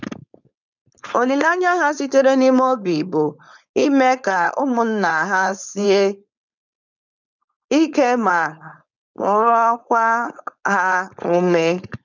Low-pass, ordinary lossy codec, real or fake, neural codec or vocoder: 7.2 kHz; none; fake; codec, 16 kHz, 4.8 kbps, FACodec